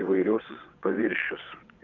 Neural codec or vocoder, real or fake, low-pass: vocoder, 22.05 kHz, 80 mel bands, WaveNeXt; fake; 7.2 kHz